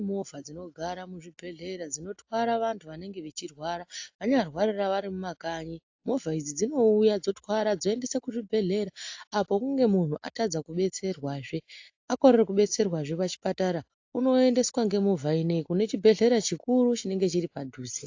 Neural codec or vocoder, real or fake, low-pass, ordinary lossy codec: none; real; 7.2 kHz; AAC, 48 kbps